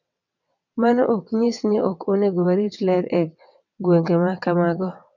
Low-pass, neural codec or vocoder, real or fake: 7.2 kHz; vocoder, 22.05 kHz, 80 mel bands, WaveNeXt; fake